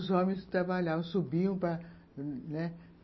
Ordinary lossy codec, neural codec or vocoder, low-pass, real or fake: MP3, 24 kbps; none; 7.2 kHz; real